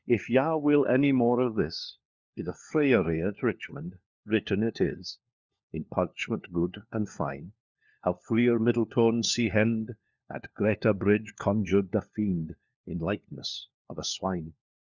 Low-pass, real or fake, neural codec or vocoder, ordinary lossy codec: 7.2 kHz; fake; codec, 16 kHz, 4 kbps, FunCodec, trained on LibriTTS, 50 frames a second; Opus, 64 kbps